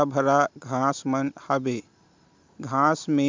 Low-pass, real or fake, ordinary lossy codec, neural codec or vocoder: 7.2 kHz; real; MP3, 64 kbps; none